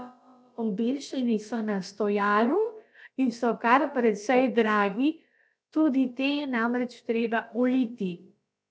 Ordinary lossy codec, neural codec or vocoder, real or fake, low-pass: none; codec, 16 kHz, about 1 kbps, DyCAST, with the encoder's durations; fake; none